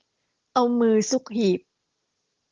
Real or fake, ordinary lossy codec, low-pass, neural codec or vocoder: real; Opus, 16 kbps; 7.2 kHz; none